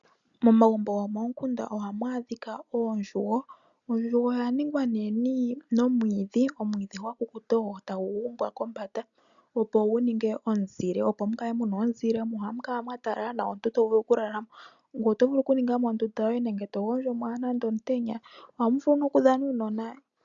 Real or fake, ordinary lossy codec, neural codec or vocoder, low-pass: real; MP3, 96 kbps; none; 7.2 kHz